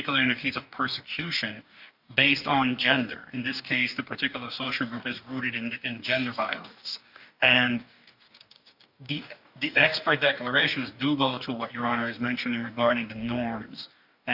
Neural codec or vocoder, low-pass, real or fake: codec, 44.1 kHz, 2.6 kbps, DAC; 5.4 kHz; fake